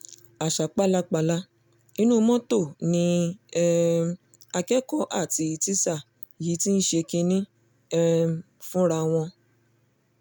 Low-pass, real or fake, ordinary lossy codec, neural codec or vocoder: none; real; none; none